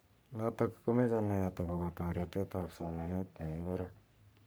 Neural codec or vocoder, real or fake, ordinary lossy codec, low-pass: codec, 44.1 kHz, 3.4 kbps, Pupu-Codec; fake; none; none